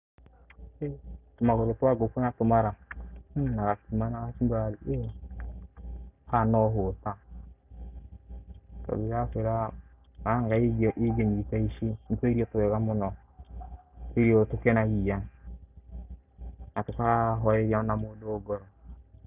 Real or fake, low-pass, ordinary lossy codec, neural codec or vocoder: real; 3.6 kHz; Opus, 64 kbps; none